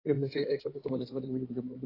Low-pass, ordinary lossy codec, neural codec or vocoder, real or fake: 5.4 kHz; AAC, 24 kbps; codec, 16 kHz in and 24 kHz out, 2.2 kbps, FireRedTTS-2 codec; fake